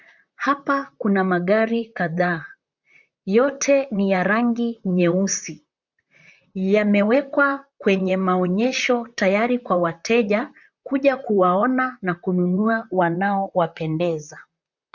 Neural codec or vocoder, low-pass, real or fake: vocoder, 44.1 kHz, 128 mel bands, Pupu-Vocoder; 7.2 kHz; fake